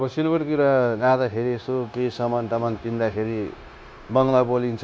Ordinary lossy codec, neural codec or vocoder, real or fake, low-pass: none; codec, 16 kHz, 0.9 kbps, LongCat-Audio-Codec; fake; none